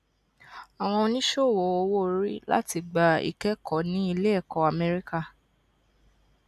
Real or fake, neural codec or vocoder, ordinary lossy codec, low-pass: real; none; none; 14.4 kHz